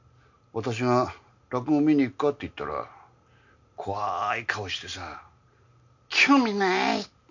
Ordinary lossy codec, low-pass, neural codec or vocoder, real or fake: none; 7.2 kHz; none; real